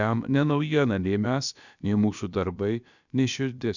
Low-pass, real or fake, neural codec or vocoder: 7.2 kHz; fake; codec, 16 kHz, about 1 kbps, DyCAST, with the encoder's durations